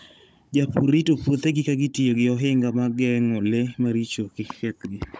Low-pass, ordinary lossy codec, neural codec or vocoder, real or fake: none; none; codec, 16 kHz, 16 kbps, FunCodec, trained on Chinese and English, 50 frames a second; fake